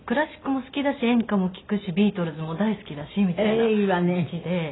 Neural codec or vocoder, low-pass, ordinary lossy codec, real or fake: none; 7.2 kHz; AAC, 16 kbps; real